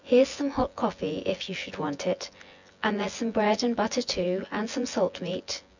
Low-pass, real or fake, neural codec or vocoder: 7.2 kHz; fake; vocoder, 24 kHz, 100 mel bands, Vocos